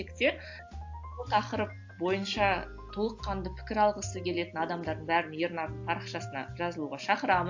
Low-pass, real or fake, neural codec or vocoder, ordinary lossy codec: 7.2 kHz; real; none; AAC, 48 kbps